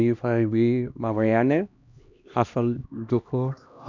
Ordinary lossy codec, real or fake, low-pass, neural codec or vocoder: none; fake; 7.2 kHz; codec, 16 kHz, 1 kbps, X-Codec, HuBERT features, trained on LibriSpeech